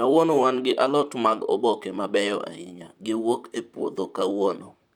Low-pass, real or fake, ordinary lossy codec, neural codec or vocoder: 19.8 kHz; fake; none; vocoder, 44.1 kHz, 128 mel bands, Pupu-Vocoder